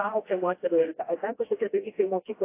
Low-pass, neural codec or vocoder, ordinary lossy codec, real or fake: 3.6 kHz; codec, 16 kHz, 1 kbps, FreqCodec, smaller model; AAC, 24 kbps; fake